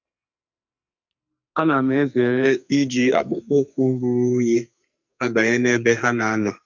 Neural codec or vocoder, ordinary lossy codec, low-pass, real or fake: codec, 44.1 kHz, 2.6 kbps, SNAC; none; 7.2 kHz; fake